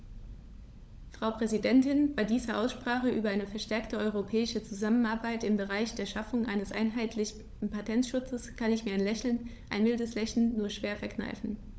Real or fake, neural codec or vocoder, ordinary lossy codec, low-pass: fake; codec, 16 kHz, 16 kbps, FunCodec, trained on LibriTTS, 50 frames a second; none; none